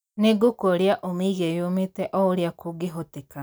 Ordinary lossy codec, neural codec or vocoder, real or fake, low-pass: none; none; real; none